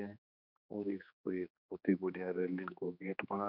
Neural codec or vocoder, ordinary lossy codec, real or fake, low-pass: codec, 16 kHz, 2 kbps, X-Codec, HuBERT features, trained on general audio; none; fake; 5.4 kHz